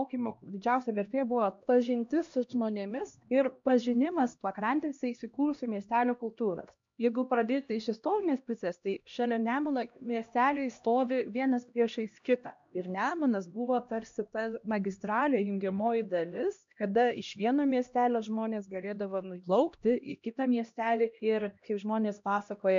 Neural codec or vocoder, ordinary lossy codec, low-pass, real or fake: codec, 16 kHz, 1 kbps, X-Codec, HuBERT features, trained on LibriSpeech; MP3, 64 kbps; 7.2 kHz; fake